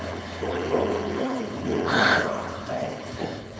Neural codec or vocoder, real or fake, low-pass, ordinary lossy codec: codec, 16 kHz, 4.8 kbps, FACodec; fake; none; none